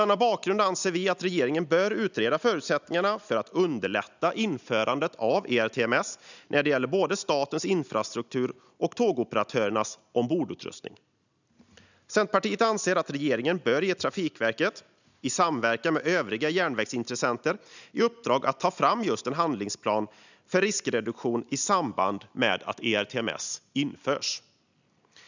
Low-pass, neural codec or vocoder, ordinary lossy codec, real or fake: 7.2 kHz; none; none; real